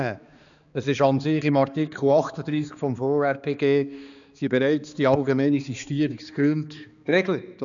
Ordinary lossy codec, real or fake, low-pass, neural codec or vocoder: none; fake; 7.2 kHz; codec, 16 kHz, 2 kbps, X-Codec, HuBERT features, trained on balanced general audio